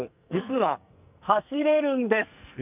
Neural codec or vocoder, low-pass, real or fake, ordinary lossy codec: codec, 44.1 kHz, 2.6 kbps, SNAC; 3.6 kHz; fake; none